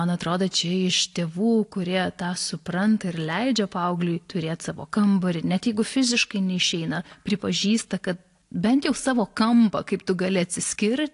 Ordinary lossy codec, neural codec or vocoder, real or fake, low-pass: AAC, 48 kbps; none; real; 10.8 kHz